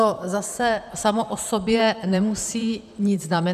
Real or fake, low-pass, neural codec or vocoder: fake; 14.4 kHz; vocoder, 44.1 kHz, 128 mel bands, Pupu-Vocoder